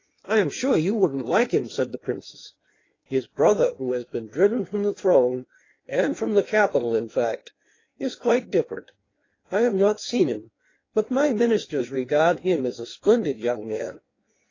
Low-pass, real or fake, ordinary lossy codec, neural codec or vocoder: 7.2 kHz; fake; AAC, 32 kbps; codec, 16 kHz in and 24 kHz out, 1.1 kbps, FireRedTTS-2 codec